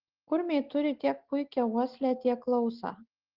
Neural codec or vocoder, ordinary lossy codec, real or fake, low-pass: none; Opus, 16 kbps; real; 5.4 kHz